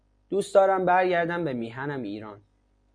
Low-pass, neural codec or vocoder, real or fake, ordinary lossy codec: 9.9 kHz; none; real; MP3, 64 kbps